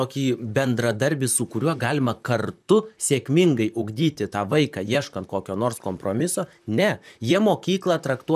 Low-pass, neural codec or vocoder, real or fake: 14.4 kHz; vocoder, 44.1 kHz, 128 mel bands, Pupu-Vocoder; fake